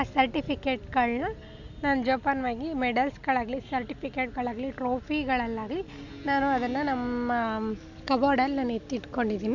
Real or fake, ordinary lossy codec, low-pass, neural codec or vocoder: real; none; 7.2 kHz; none